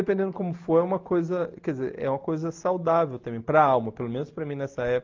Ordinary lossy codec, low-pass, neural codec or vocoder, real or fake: Opus, 32 kbps; 7.2 kHz; none; real